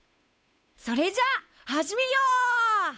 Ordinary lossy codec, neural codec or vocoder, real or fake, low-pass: none; codec, 16 kHz, 8 kbps, FunCodec, trained on Chinese and English, 25 frames a second; fake; none